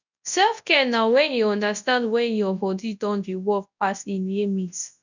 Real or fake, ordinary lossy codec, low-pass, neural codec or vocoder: fake; none; 7.2 kHz; codec, 24 kHz, 0.9 kbps, WavTokenizer, large speech release